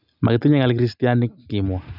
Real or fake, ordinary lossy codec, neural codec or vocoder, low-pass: real; none; none; 5.4 kHz